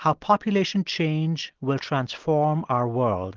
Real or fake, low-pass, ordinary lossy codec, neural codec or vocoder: real; 7.2 kHz; Opus, 16 kbps; none